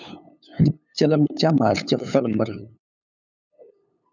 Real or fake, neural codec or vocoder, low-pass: fake; codec, 16 kHz, 8 kbps, FunCodec, trained on LibriTTS, 25 frames a second; 7.2 kHz